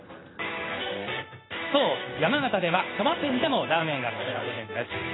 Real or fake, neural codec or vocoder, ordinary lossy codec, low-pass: fake; codec, 16 kHz in and 24 kHz out, 1 kbps, XY-Tokenizer; AAC, 16 kbps; 7.2 kHz